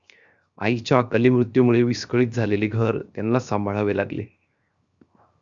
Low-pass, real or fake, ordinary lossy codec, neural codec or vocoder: 7.2 kHz; fake; Opus, 64 kbps; codec, 16 kHz, 0.7 kbps, FocalCodec